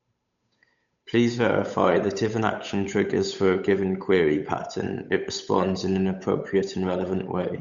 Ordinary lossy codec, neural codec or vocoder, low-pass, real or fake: none; codec, 16 kHz, 8 kbps, FunCodec, trained on Chinese and English, 25 frames a second; 7.2 kHz; fake